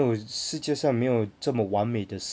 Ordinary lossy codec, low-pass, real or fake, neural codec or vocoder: none; none; real; none